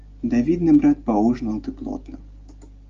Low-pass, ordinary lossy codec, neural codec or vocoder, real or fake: 7.2 kHz; Opus, 32 kbps; none; real